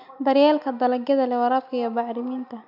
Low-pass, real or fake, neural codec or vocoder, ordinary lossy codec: 5.4 kHz; real; none; none